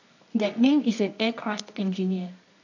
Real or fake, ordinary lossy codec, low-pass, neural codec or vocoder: fake; none; 7.2 kHz; codec, 24 kHz, 0.9 kbps, WavTokenizer, medium music audio release